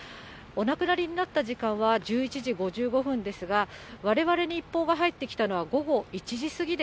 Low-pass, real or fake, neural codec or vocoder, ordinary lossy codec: none; real; none; none